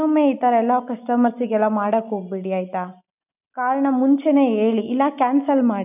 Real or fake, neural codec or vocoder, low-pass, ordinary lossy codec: real; none; 3.6 kHz; none